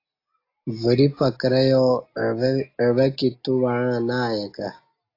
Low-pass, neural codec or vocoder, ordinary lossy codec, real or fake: 5.4 kHz; none; AAC, 32 kbps; real